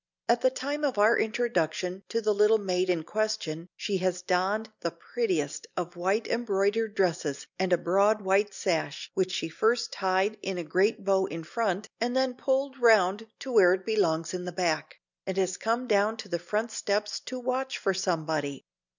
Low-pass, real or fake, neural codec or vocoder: 7.2 kHz; real; none